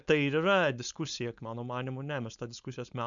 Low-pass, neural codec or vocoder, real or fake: 7.2 kHz; codec, 16 kHz, 4.8 kbps, FACodec; fake